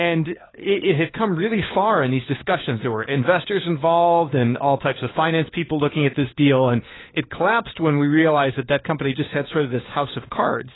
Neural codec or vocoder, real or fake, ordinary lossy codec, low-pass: codec, 16 kHz, 4 kbps, FunCodec, trained on LibriTTS, 50 frames a second; fake; AAC, 16 kbps; 7.2 kHz